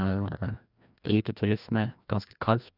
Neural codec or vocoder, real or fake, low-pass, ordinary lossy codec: codec, 16 kHz, 1 kbps, FreqCodec, larger model; fake; 5.4 kHz; none